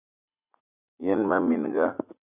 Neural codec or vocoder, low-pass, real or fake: vocoder, 44.1 kHz, 80 mel bands, Vocos; 3.6 kHz; fake